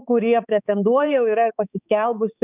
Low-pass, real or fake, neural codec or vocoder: 3.6 kHz; fake; codec, 16 kHz, 4 kbps, X-Codec, HuBERT features, trained on balanced general audio